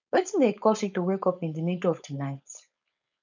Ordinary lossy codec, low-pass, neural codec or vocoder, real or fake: none; 7.2 kHz; codec, 16 kHz, 4.8 kbps, FACodec; fake